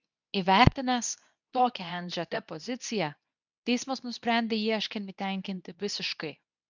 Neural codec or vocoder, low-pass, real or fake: codec, 24 kHz, 0.9 kbps, WavTokenizer, medium speech release version 2; 7.2 kHz; fake